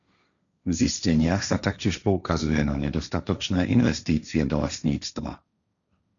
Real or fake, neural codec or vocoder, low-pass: fake; codec, 16 kHz, 1.1 kbps, Voila-Tokenizer; 7.2 kHz